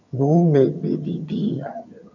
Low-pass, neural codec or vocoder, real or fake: 7.2 kHz; vocoder, 22.05 kHz, 80 mel bands, HiFi-GAN; fake